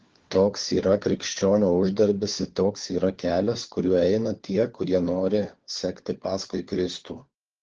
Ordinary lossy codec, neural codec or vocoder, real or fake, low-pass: Opus, 16 kbps; codec, 16 kHz, 4 kbps, FunCodec, trained on LibriTTS, 50 frames a second; fake; 7.2 kHz